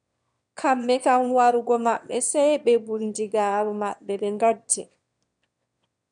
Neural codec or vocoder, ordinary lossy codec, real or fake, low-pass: autoencoder, 22.05 kHz, a latent of 192 numbers a frame, VITS, trained on one speaker; MP3, 96 kbps; fake; 9.9 kHz